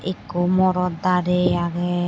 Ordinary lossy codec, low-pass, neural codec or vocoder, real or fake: none; none; none; real